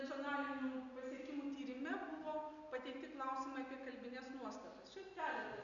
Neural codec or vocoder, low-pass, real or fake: none; 7.2 kHz; real